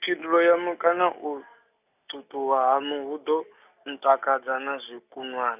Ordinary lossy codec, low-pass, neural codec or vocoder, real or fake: none; 3.6 kHz; none; real